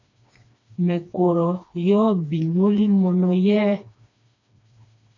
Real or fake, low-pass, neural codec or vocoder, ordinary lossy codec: fake; 7.2 kHz; codec, 16 kHz, 2 kbps, FreqCodec, smaller model; AAC, 48 kbps